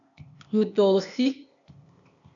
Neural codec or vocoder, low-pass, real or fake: codec, 16 kHz, 0.8 kbps, ZipCodec; 7.2 kHz; fake